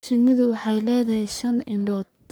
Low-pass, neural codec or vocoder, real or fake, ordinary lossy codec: none; codec, 44.1 kHz, 3.4 kbps, Pupu-Codec; fake; none